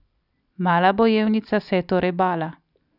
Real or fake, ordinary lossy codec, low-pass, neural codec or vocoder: real; none; 5.4 kHz; none